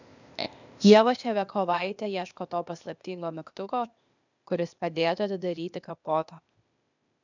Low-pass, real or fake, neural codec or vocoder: 7.2 kHz; fake; codec, 16 kHz, 0.8 kbps, ZipCodec